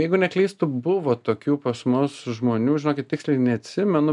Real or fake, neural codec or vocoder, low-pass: real; none; 10.8 kHz